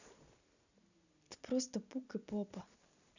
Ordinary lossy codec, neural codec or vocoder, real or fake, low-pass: none; none; real; 7.2 kHz